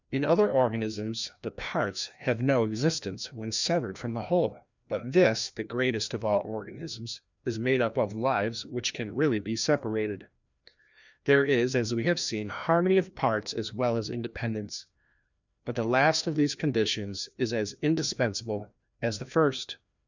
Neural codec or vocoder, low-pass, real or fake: codec, 16 kHz, 1 kbps, FreqCodec, larger model; 7.2 kHz; fake